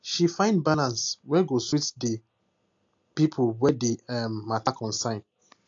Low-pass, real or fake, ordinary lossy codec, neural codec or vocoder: 7.2 kHz; real; AAC, 48 kbps; none